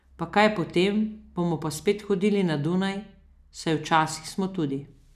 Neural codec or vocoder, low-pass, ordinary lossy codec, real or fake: none; 14.4 kHz; none; real